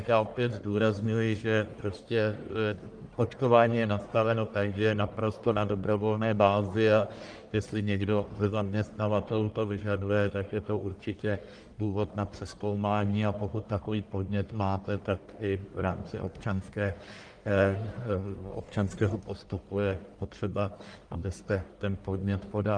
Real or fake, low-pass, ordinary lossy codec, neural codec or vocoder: fake; 9.9 kHz; Opus, 32 kbps; codec, 44.1 kHz, 1.7 kbps, Pupu-Codec